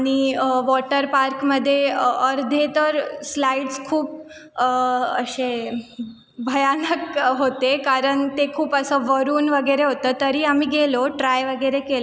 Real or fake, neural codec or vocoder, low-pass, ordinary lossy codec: real; none; none; none